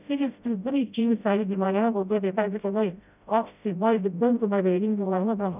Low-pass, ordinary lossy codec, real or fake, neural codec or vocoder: 3.6 kHz; none; fake; codec, 16 kHz, 0.5 kbps, FreqCodec, smaller model